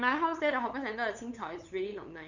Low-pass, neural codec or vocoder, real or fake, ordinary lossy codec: 7.2 kHz; codec, 16 kHz, 8 kbps, FunCodec, trained on LibriTTS, 25 frames a second; fake; none